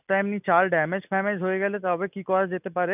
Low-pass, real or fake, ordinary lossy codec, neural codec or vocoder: 3.6 kHz; real; none; none